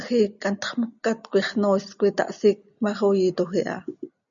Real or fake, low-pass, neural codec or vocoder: real; 7.2 kHz; none